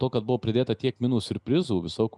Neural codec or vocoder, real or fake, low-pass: none; real; 10.8 kHz